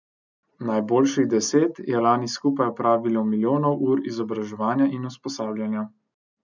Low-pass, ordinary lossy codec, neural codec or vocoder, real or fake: 7.2 kHz; none; none; real